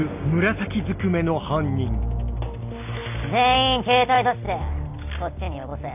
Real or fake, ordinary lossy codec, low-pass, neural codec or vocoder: real; AAC, 32 kbps; 3.6 kHz; none